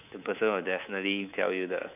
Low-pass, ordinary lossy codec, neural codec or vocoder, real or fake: 3.6 kHz; none; codec, 24 kHz, 3.1 kbps, DualCodec; fake